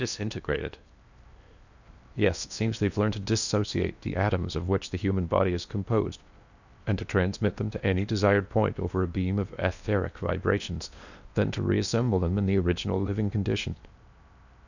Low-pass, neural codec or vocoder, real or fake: 7.2 kHz; codec, 16 kHz in and 24 kHz out, 0.8 kbps, FocalCodec, streaming, 65536 codes; fake